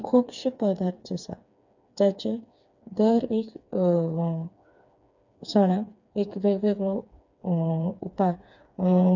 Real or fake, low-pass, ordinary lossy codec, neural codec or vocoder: fake; 7.2 kHz; none; codec, 16 kHz, 4 kbps, FreqCodec, smaller model